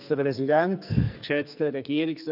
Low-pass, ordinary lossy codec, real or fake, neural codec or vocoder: 5.4 kHz; none; fake; codec, 44.1 kHz, 2.6 kbps, SNAC